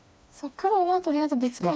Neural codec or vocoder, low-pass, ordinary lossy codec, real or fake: codec, 16 kHz, 2 kbps, FreqCodec, smaller model; none; none; fake